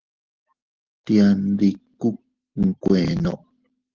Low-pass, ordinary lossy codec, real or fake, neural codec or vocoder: 7.2 kHz; Opus, 16 kbps; real; none